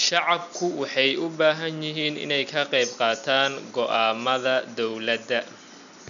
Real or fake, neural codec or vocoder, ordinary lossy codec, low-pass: real; none; none; 7.2 kHz